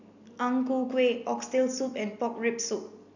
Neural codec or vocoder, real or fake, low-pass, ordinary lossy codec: none; real; 7.2 kHz; none